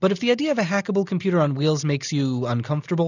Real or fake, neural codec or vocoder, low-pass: real; none; 7.2 kHz